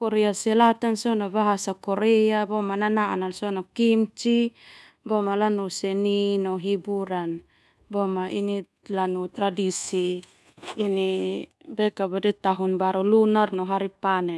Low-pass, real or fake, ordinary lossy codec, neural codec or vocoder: none; fake; none; codec, 24 kHz, 1.2 kbps, DualCodec